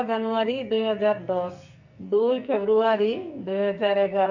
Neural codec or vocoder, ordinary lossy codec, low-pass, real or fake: codec, 44.1 kHz, 2.6 kbps, SNAC; none; 7.2 kHz; fake